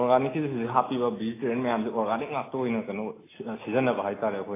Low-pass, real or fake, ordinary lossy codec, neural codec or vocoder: 3.6 kHz; real; AAC, 16 kbps; none